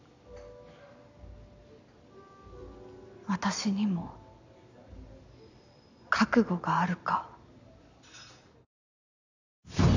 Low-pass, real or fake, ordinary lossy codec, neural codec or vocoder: 7.2 kHz; real; none; none